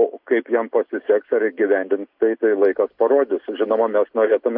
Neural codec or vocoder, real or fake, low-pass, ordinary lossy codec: none; real; 5.4 kHz; MP3, 48 kbps